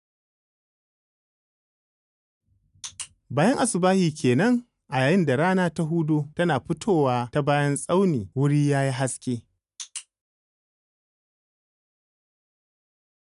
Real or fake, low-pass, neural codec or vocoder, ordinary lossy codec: real; 10.8 kHz; none; none